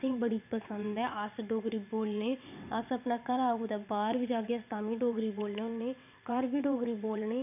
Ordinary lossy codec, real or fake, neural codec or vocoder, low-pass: none; fake; vocoder, 22.05 kHz, 80 mel bands, WaveNeXt; 3.6 kHz